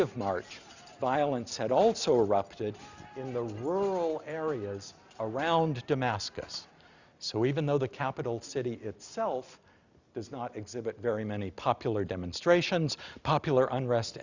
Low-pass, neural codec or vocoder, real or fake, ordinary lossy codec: 7.2 kHz; none; real; Opus, 64 kbps